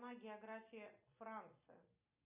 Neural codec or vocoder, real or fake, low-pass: none; real; 3.6 kHz